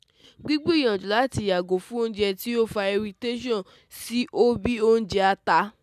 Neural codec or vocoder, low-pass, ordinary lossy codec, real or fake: none; 14.4 kHz; none; real